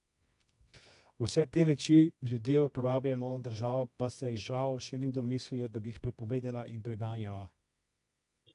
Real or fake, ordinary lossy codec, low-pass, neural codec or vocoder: fake; AAC, 64 kbps; 10.8 kHz; codec, 24 kHz, 0.9 kbps, WavTokenizer, medium music audio release